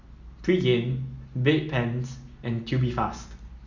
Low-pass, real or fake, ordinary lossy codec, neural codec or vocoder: 7.2 kHz; real; Opus, 64 kbps; none